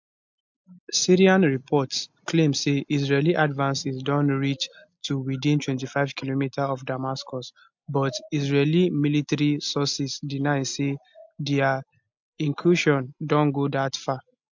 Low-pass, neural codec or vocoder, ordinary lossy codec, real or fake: 7.2 kHz; none; MP3, 64 kbps; real